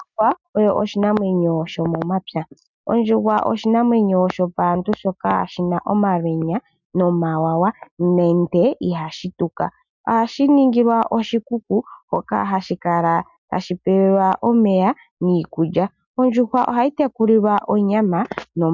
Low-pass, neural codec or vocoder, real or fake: 7.2 kHz; none; real